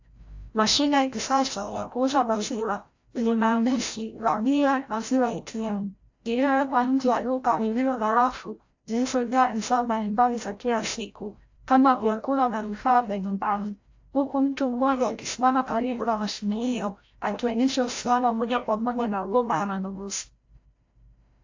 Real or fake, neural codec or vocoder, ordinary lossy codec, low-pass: fake; codec, 16 kHz, 0.5 kbps, FreqCodec, larger model; AAC, 48 kbps; 7.2 kHz